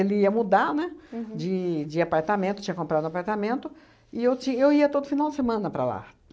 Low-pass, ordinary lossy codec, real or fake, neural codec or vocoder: none; none; real; none